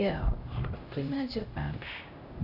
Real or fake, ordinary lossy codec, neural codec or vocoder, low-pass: fake; none; codec, 16 kHz, 0.5 kbps, X-Codec, HuBERT features, trained on LibriSpeech; 5.4 kHz